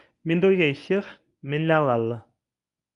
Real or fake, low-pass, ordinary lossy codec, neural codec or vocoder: fake; 10.8 kHz; none; codec, 24 kHz, 0.9 kbps, WavTokenizer, medium speech release version 1